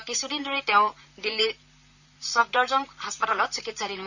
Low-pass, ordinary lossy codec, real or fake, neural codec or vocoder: 7.2 kHz; none; fake; vocoder, 44.1 kHz, 128 mel bands, Pupu-Vocoder